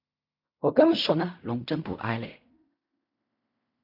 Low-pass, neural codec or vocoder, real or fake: 5.4 kHz; codec, 16 kHz in and 24 kHz out, 0.4 kbps, LongCat-Audio-Codec, fine tuned four codebook decoder; fake